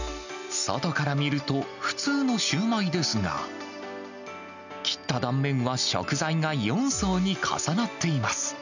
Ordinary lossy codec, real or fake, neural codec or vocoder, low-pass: none; real; none; 7.2 kHz